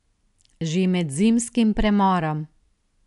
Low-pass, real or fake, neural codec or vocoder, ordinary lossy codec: 10.8 kHz; real; none; none